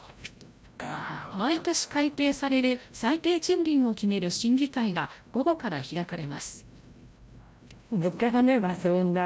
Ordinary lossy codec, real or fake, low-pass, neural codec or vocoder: none; fake; none; codec, 16 kHz, 0.5 kbps, FreqCodec, larger model